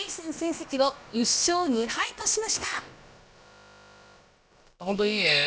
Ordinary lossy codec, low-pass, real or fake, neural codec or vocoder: none; none; fake; codec, 16 kHz, about 1 kbps, DyCAST, with the encoder's durations